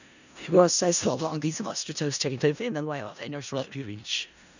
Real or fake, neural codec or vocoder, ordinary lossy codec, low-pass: fake; codec, 16 kHz in and 24 kHz out, 0.4 kbps, LongCat-Audio-Codec, four codebook decoder; none; 7.2 kHz